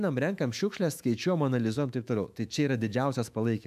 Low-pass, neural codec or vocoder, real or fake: 14.4 kHz; autoencoder, 48 kHz, 128 numbers a frame, DAC-VAE, trained on Japanese speech; fake